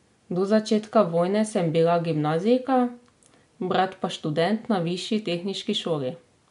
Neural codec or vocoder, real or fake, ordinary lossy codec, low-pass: none; real; MP3, 64 kbps; 10.8 kHz